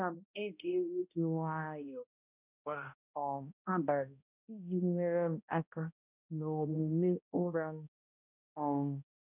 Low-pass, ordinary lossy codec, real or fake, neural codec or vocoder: 3.6 kHz; none; fake; codec, 16 kHz, 0.5 kbps, X-Codec, HuBERT features, trained on balanced general audio